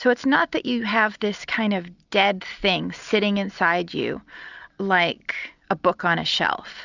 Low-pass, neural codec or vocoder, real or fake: 7.2 kHz; none; real